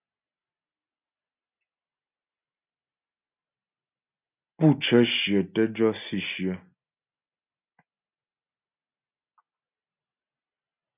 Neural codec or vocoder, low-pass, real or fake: none; 3.6 kHz; real